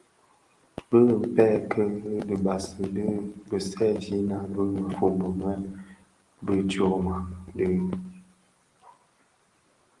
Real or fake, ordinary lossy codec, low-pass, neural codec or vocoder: real; Opus, 24 kbps; 10.8 kHz; none